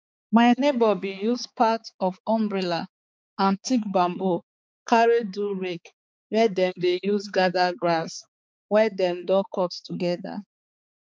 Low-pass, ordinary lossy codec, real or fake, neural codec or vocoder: none; none; fake; codec, 16 kHz, 4 kbps, X-Codec, HuBERT features, trained on balanced general audio